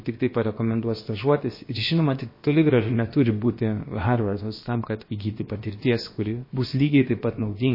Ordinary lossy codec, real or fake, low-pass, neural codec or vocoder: MP3, 24 kbps; fake; 5.4 kHz; codec, 16 kHz, about 1 kbps, DyCAST, with the encoder's durations